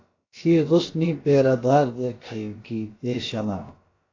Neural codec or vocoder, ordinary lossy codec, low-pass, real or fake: codec, 16 kHz, about 1 kbps, DyCAST, with the encoder's durations; AAC, 32 kbps; 7.2 kHz; fake